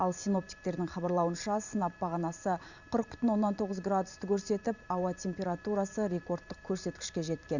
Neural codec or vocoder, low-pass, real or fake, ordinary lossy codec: vocoder, 44.1 kHz, 128 mel bands every 512 samples, BigVGAN v2; 7.2 kHz; fake; none